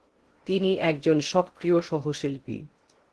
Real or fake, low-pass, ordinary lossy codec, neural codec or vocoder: fake; 10.8 kHz; Opus, 16 kbps; codec, 16 kHz in and 24 kHz out, 0.6 kbps, FocalCodec, streaming, 2048 codes